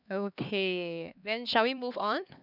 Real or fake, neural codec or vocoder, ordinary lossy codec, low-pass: fake; codec, 16 kHz, 2 kbps, X-Codec, HuBERT features, trained on LibriSpeech; none; 5.4 kHz